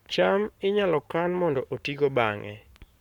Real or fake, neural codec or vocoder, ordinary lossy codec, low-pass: fake; codec, 44.1 kHz, 7.8 kbps, Pupu-Codec; none; 19.8 kHz